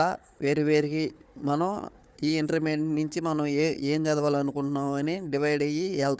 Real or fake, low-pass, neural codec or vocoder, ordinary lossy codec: fake; none; codec, 16 kHz, 4 kbps, FunCodec, trained on Chinese and English, 50 frames a second; none